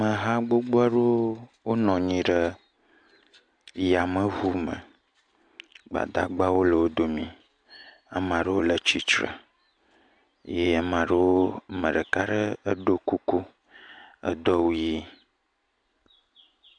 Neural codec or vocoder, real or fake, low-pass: none; real; 9.9 kHz